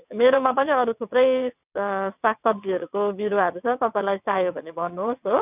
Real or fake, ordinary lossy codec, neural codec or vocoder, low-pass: fake; none; vocoder, 22.05 kHz, 80 mel bands, WaveNeXt; 3.6 kHz